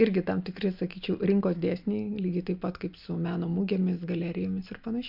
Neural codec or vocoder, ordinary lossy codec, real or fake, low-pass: none; MP3, 48 kbps; real; 5.4 kHz